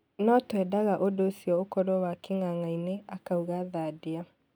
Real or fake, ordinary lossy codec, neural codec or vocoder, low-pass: real; none; none; none